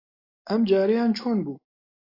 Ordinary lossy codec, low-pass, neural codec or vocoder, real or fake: AAC, 32 kbps; 5.4 kHz; none; real